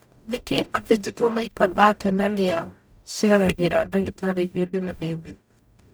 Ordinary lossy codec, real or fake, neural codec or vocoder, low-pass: none; fake; codec, 44.1 kHz, 0.9 kbps, DAC; none